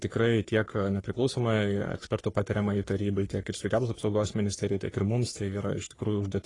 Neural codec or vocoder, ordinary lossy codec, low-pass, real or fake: codec, 44.1 kHz, 3.4 kbps, Pupu-Codec; AAC, 32 kbps; 10.8 kHz; fake